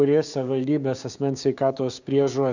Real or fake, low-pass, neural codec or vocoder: fake; 7.2 kHz; codec, 16 kHz, 6 kbps, DAC